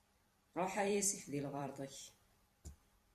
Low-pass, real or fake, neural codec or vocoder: 14.4 kHz; fake; vocoder, 44.1 kHz, 128 mel bands every 256 samples, BigVGAN v2